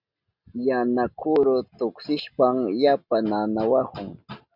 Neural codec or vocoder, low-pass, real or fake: none; 5.4 kHz; real